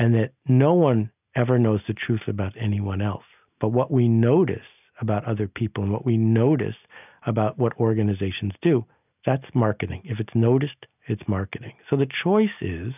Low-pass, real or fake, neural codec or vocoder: 3.6 kHz; real; none